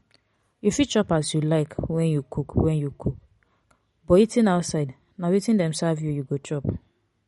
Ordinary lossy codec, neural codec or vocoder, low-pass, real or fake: MP3, 48 kbps; none; 19.8 kHz; real